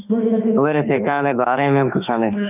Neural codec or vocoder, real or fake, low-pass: autoencoder, 48 kHz, 32 numbers a frame, DAC-VAE, trained on Japanese speech; fake; 3.6 kHz